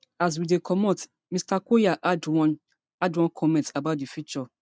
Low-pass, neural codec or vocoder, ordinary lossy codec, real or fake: none; none; none; real